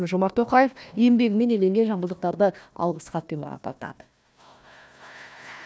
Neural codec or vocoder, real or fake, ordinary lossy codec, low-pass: codec, 16 kHz, 1 kbps, FunCodec, trained on Chinese and English, 50 frames a second; fake; none; none